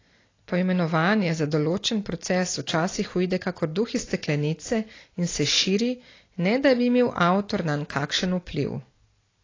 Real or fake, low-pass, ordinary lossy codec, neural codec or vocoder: real; 7.2 kHz; AAC, 32 kbps; none